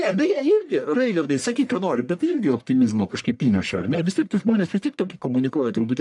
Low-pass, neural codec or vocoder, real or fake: 10.8 kHz; codec, 44.1 kHz, 1.7 kbps, Pupu-Codec; fake